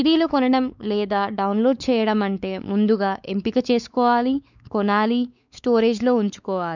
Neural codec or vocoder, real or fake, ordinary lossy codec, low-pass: codec, 16 kHz, 4 kbps, X-Codec, WavLM features, trained on Multilingual LibriSpeech; fake; none; 7.2 kHz